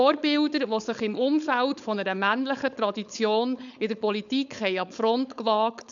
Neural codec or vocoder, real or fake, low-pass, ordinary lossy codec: codec, 16 kHz, 4.8 kbps, FACodec; fake; 7.2 kHz; none